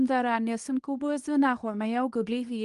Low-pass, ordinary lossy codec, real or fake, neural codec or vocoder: 10.8 kHz; Opus, 24 kbps; fake; codec, 24 kHz, 0.9 kbps, WavTokenizer, medium speech release version 2